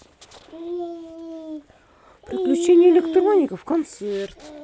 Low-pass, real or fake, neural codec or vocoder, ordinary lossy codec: none; real; none; none